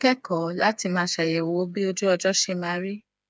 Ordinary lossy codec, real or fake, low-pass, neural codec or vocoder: none; fake; none; codec, 16 kHz, 4 kbps, FreqCodec, smaller model